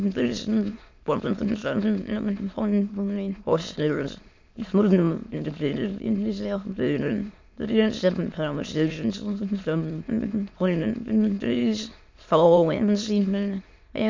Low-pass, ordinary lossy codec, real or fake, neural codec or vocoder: 7.2 kHz; MP3, 48 kbps; fake; autoencoder, 22.05 kHz, a latent of 192 numbers a frame, VITS, trained on many speakers